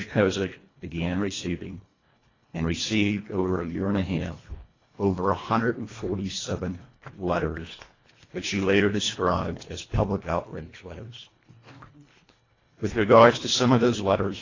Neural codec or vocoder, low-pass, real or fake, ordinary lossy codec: codec, 24 kHz, 1.5 kbps, HILCodec; 7.2 kHz; fake; AAC, 32 kbps